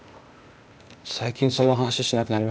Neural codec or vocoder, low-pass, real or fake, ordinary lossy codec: codec, 16 kHz, 0.8 kbps, ZipCodec; none; fake; none